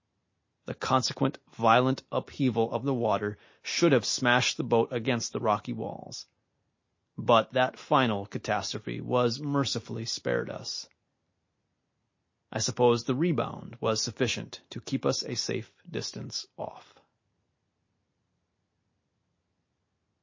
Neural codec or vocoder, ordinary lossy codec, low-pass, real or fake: none; MP3, 32 kbps; 7.2 kHz; real